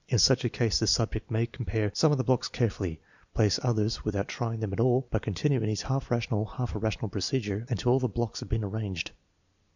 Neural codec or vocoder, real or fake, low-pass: vocoder, 44.1 kHz, 128 mel bands every 256 samples, BigVGAN v2; fake; 7.2 kHz